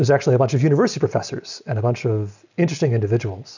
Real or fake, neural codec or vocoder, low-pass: real; none; 7.2 kHz